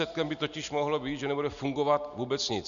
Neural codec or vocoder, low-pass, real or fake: none; 7.2 kHz; real